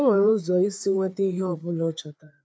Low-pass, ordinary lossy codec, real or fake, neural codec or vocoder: none; none; fake; codec, 16 kHz, 4 kbps, FreqCodec, larger model